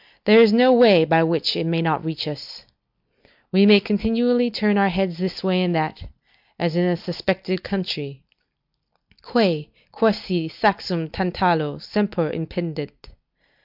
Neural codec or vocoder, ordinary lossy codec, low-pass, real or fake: none; MP3, 48 kbps; 5.4 kHz; real